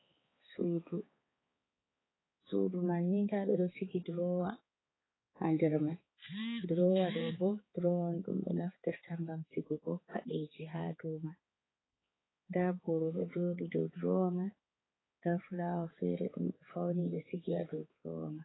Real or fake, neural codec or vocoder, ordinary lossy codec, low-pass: fake; codec, 16 kHz, 4 kbps, X-Codec, HuBERT features, trained on balanced general audio; AAC, 16 kbps; 7.2 kHz